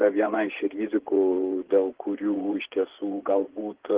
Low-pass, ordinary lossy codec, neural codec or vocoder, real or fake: 3.6 kHz; Opus, 16 kbps; vocoder, 24 kHz, 100 mel bands, Vocos; fake